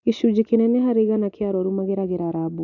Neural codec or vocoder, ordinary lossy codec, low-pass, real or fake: none; none; 7.2 kHz; real